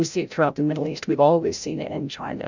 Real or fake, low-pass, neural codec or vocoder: fake; 7.2 kHz; codec, 16 kHz, 0.5 kbps, FreqCodec, larger model